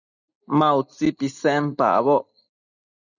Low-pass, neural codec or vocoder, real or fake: 7.2 kHz; none; real